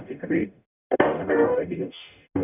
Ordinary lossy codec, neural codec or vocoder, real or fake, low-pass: none; codec, 44.1 kHz, 0.9 kbps, DAC; fake; 3.6 kHz